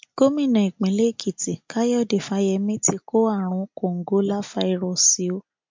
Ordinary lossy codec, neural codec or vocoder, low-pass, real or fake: MP3, 48 kbps; none; 7.2 kHz; real